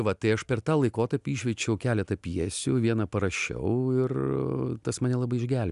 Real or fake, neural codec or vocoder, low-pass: real; none; 10.8 kHz